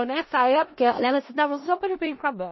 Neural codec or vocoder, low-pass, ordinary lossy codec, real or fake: codec, 16 kHz in and 24 kHz out, 0.4 kbps, LongCat-Audio-Codec, four codebook decoder; 7.2 kHz; MP3, 24 kbps; fake